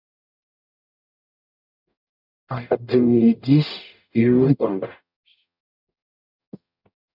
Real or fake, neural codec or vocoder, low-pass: fake; codec, 44.1 kHz, 0.9 kbps, DAC; 5.4 kHz